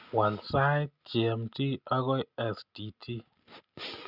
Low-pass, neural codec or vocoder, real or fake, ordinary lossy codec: 5.4 kHz; none; real; Opus, 64 kbps